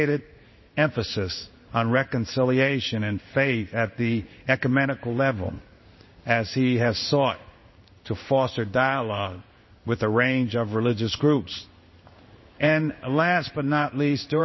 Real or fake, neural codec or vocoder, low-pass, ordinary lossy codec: fake; codec, 16 kHz in and 24 kHz out, 1 kbps, XY-Tokenizer; 7.2 kHz; MP3, 24 kbps